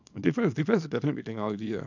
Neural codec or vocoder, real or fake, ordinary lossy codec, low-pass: codec, 24 kHz, 0.9 kbps, WavTokenizer, small release; fake; none; 7.2 kHz